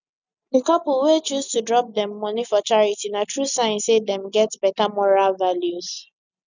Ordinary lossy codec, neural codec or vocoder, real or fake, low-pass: none; none; real; 7.2 kHz